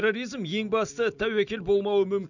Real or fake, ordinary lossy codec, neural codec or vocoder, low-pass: real; none; none; 7.2 kHz